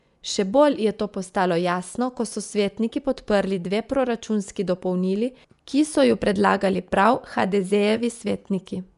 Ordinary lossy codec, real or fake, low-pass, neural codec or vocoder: none; fake; 10.8 kHz; vocoder, 24 kHz, 100 mel bands, Vocos